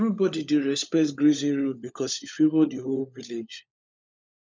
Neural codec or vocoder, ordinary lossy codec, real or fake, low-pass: codec, 16 kHz, 16 kbps, FunCodec, trained on LibriTTS, 50 frames a second; none; fake; none